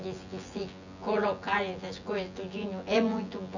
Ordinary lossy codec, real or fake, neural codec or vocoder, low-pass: none; fake; vocoder, 24 kHz, 100 mel bands, Vocos; 7.2 kHz